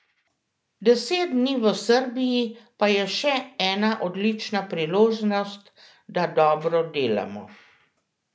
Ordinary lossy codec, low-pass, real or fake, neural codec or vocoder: none; none; real; none